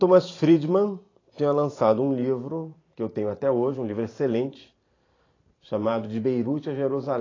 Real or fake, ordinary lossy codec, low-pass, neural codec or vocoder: fake; AAC, 32 kbps; 7.2 kHz; vocoder, 44.1 kHz, 128 mel bands every 512 samples, BigVGAN v2